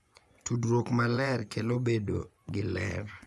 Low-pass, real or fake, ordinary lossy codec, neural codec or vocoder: none; fake; none; vocoder, 24 kHz, 100 mel bands, Vocos